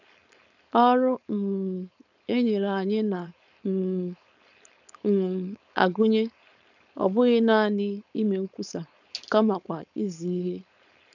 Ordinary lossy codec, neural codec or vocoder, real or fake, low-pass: none; codec, 16 kHz, 4.8 kbps, FACodec; fake; 7.2 kHz